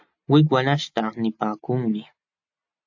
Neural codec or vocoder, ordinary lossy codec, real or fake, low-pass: none; AAC, 48 kbps; real; 7.2 kHz